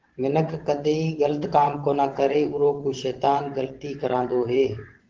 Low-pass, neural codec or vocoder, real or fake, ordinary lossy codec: 7.2 kHz; vocoder, 22.05 kHz, 80 mel bands, WaveNeXt; fake; Opus, 16 kbps